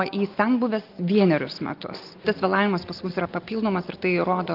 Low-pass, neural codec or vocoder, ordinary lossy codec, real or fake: 5.4 kHz; none; Opus, 32 kbps; real